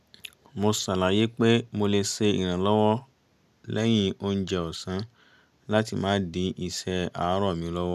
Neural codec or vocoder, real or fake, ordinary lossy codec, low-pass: none; real; none; 14.4 kHz